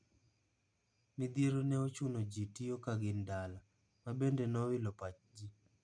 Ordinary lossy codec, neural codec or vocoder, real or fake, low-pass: none; none; real; 9.9 kHz